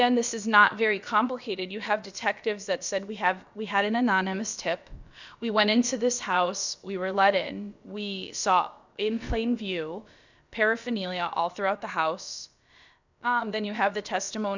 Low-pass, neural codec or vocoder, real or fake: 7.2 kHz; codec, 16 kHz, about 1 kbps, DyCAST, with the encoder's durations; fake